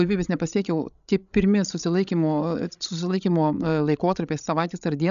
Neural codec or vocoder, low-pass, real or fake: codec, 16 kHz, 16 kbps, FreqCodec, larger model; 7.2 kHz; fake